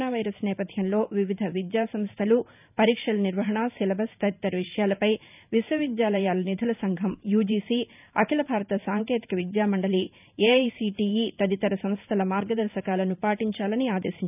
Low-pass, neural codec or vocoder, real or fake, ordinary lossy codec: 3.6 kHz; vocoder, 44.1 kHz, 128 mel bands every 512 samples, BigVGAN v2; fake; none